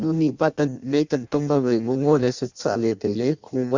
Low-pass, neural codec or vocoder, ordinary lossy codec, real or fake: 7.2 kHz; codec, 16 kHz in and 24 kHz out, 0.6 kbps, FireRedTTS-2 codec; Opus, 64 kbps; fake